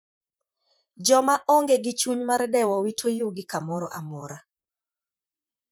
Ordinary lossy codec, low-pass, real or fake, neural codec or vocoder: none; none; fake; vocoder, 44.1 kHz, 128 mel bands, Pupu-Vocoder